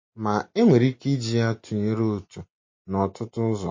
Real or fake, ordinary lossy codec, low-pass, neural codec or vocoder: real; MP3, 32 kbps; 7.2 kHz; none